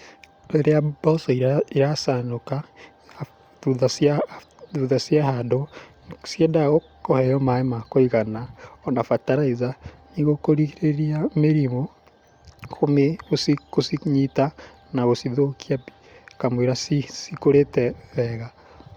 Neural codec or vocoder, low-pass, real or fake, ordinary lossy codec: none; 19.8 kHz; real; Opus, 64 kbps